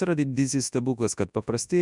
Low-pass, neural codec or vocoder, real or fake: 10.8 kHz; codec, 24 kHz, 0.9 kbps, WavTokenizer, large speech release; fake